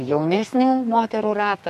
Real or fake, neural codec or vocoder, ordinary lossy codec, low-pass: fake; codec, 32 kHz, 1.9 kbps, SNAC; MP3, 64 kbps; 14.4 kHz